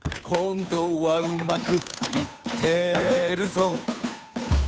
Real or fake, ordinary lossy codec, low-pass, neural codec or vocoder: fake; none; none; codec, 16 kHz, 2 kbps, FunCodec, trained on Chinese and English, 25 frames a second